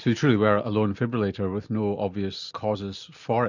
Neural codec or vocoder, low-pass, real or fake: none; 7.2 kHz; real